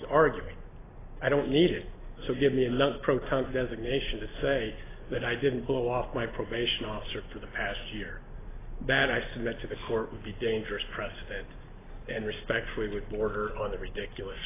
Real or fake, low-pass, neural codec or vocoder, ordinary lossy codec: real; 3.6 kHz; none; AAC, 16 kbps